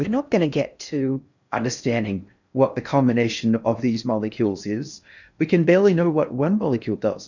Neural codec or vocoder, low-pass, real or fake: codec, 16 kHz in and 24 kHz out, 0.6 kbps, FocalCodec, streaming, 4096 codes; 7.2 kHz; fake